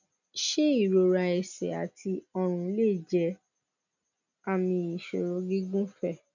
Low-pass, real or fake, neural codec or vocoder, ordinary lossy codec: 7.2 kHz; real; none; none